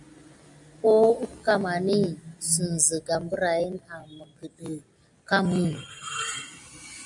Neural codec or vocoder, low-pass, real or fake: none; 10.8 kHz; real